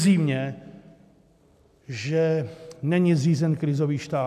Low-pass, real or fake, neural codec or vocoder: 14.4 kHz; real; none